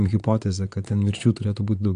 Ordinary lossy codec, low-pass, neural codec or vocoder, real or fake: AAC, 64 kbps; 9.9 kHz; vocoder, 22.05 kHz, 80 mel bands, Vocos; fake